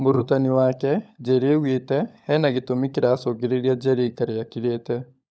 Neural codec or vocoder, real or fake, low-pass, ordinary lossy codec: codec, 16 kHz, 16 kbps, FunCodec, trained on LibriTTS, 50 frames a second; fake; none; none